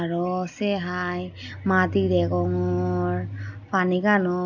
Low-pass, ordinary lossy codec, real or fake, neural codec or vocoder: 7.2 kHz; none; real; none